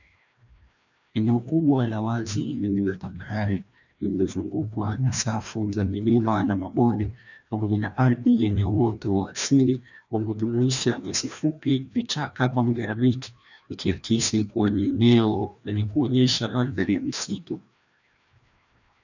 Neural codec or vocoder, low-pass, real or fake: codec, 16 kHz, 1 kbps, FreqCodec, larger model; 7.2 kHz; fake